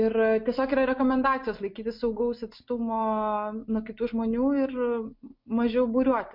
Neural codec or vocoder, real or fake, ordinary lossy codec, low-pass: none; real; MP3, 48 kbps; 5.4 kHz